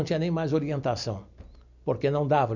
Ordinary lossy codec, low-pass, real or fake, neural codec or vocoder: none; 7.2 kHz; real; none